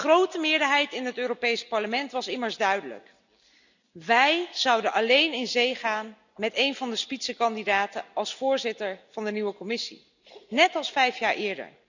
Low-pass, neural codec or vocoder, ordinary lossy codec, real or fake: 7.2 kHz; none; none; real